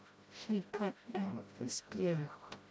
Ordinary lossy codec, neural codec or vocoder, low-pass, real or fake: none; codec, 16 kHz, 0.5 kbps, FreqCodec, smaller model; none; fake